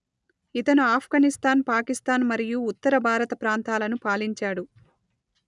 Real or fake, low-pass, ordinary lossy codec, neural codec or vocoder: real; 10.8 kHz; none; none